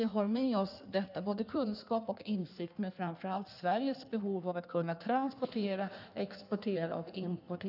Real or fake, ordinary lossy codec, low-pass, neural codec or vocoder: fake; none; 5.4 kHz; codec, 16 kHz in and 24 kHz out, 1.1 kbps, FireRedTTS-2 codec